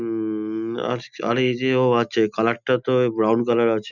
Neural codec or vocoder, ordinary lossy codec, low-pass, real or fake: none; none; 7.2 kHz; real